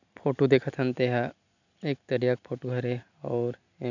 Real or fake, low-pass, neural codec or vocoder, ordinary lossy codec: real; 7.2 kHz; none; none